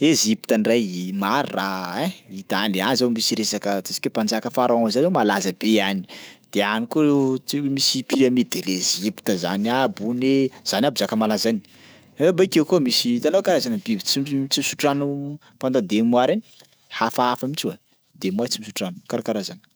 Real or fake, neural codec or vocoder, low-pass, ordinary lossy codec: fake; autoencoder, 48 kHz, 128 numbers a frame, DAC-VAE, trained on Japanese speech; none; none